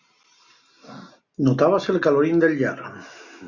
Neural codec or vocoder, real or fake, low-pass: none; real; 7.2 kHz